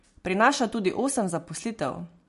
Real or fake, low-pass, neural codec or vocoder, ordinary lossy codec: real; 14.4 kHz; none; MP3, 48 kbps